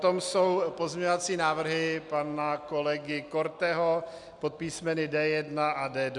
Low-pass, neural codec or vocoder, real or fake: 10.8 kHz; none; real